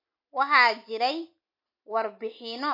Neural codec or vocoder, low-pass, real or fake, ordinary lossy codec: none; 5.4 kHz; real; MP3, 32 kbps